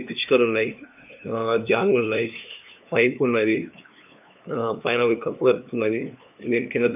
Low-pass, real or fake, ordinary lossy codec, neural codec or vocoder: 3.6 kHz; fake; none; codec, 16 kHz, 2 kbps, FunCodec, trained on LibriTTS, 25 frames a second